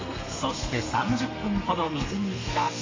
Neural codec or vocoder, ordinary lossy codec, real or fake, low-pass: codec, 32 kHz, 1.9 kbps, SNAC; AAC, 32 kbps; fake; 7.2 kHz